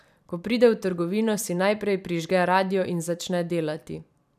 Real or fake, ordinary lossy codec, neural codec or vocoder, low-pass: fake; none; vocoder, 44.1 kHz, 128 mel bands every 256 samples, BigVGAN v2; 14.4 kHz